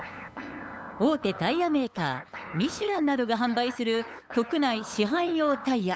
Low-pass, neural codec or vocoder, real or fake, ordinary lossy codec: none; codec, 16 kHz, 8 kbps, FunCodec, trained on LibriTTS, 25 frames a second; fake; none